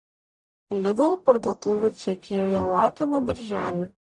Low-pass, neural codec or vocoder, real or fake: 10.8 kHz; codec, 44.1 kHz, 0.9 kbps, DAC; fake